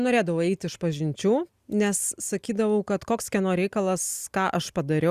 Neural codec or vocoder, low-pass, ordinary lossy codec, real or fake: none; 14.4 kHz; Opus, 64 kbps; real